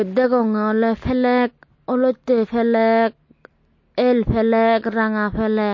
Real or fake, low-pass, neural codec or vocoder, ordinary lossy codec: real; 7.2 kHz; none; MP3, 32 kbps